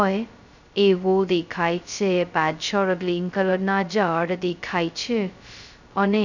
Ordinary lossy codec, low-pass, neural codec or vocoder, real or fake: none; 7.2 kHz; codec, 16 kHz, 0.2 kbps, FocalCodec; fake